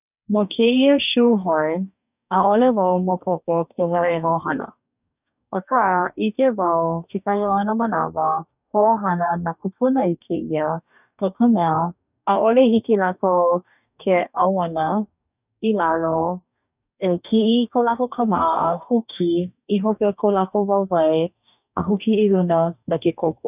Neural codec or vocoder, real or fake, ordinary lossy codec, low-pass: codec, 44.1 kHz, 2.6 kbps, DAC; fake; none; 3.6 kHz